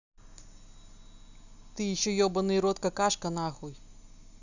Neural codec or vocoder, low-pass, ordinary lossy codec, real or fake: none; 7.2 kHz; none; real